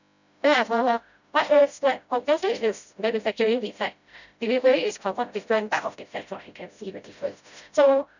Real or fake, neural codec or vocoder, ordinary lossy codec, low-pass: fake; codec, 16 kHz, 0.5 kbps, FreqCodec, smaller model; none; 7.2 kHz